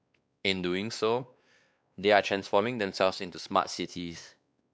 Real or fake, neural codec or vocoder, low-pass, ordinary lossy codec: fake; codec, 16 kHz, 2 kbps, X-Codec, WavLM features, trained on Multilingual LibriSpeech; none; none